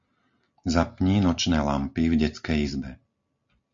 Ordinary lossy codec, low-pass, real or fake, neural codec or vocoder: MP3, 48 kbps; 7.2 kHz; real; none